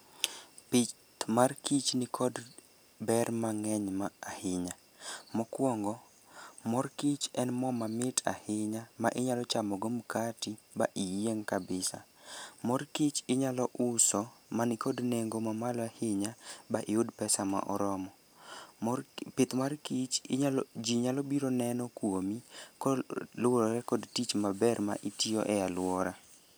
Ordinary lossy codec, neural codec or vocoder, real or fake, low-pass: none; none; real; none